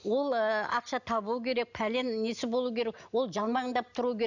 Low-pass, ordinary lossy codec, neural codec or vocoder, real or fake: 7.2 kHz; none; none; real